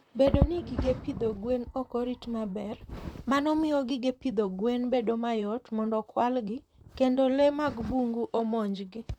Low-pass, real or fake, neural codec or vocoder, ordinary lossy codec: 19.8 kHz; fake; vocoder, 44.1 kHz, 128 mel bands, Pupu-Vocoder; none